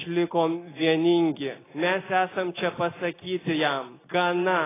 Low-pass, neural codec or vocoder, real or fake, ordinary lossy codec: 3.6 kHz; none; real; AAC, 16 kbps